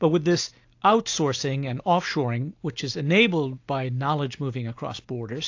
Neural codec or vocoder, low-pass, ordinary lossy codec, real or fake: none; 7.2 kHz; AAC, 48 kbps; real